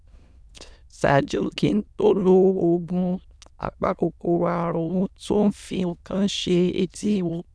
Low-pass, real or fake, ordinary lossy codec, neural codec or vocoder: none; fake; none; autoencoder, 22.05 kHz, a latent of 192 numbers a frame, VITS, trained on many speakers